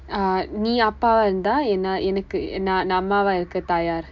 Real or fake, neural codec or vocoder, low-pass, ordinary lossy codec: real; none; 7.2 kHz; MP3, 64 kbps